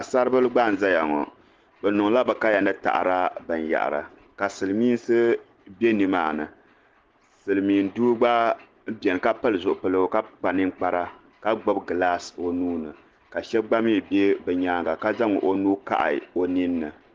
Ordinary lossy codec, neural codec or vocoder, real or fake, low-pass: Opus, 16 kbps; none; real; 7.2 kHz